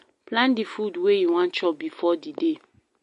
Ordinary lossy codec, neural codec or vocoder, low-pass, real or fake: MP3, 48 kbps; none; 14.4 kHz; real